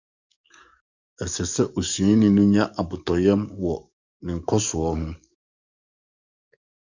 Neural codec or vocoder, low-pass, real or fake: codec, 44.1 kHz, 7.8 kbps, DAC; 7.2 kHz; fake